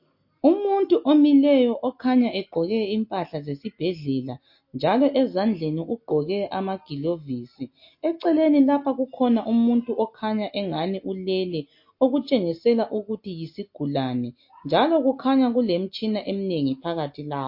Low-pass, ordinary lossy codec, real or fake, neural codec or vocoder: 5.4 kHz; MP3, 32 kbps; real; none